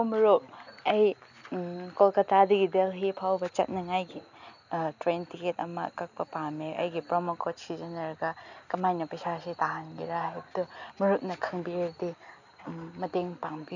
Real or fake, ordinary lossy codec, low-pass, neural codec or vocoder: real; none; 7.2 kHz; none